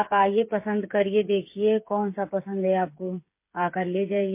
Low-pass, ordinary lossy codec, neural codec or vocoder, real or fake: 3.6 kHz; MP3, 24 kbps; codec, 16 kHz, 8 kbps, FreqCodec, smaller model; fake